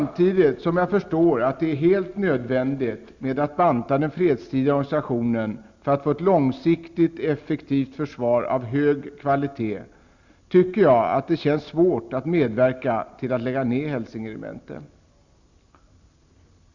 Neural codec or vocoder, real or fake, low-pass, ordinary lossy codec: none; real; 7.2 kHz; Opus, 64 kbps